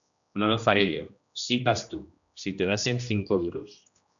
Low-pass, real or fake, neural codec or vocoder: 7.2 kHz; fake; codec, 16 kHz, 1 kbps, X-Codec, HuBERT features, trained on general audio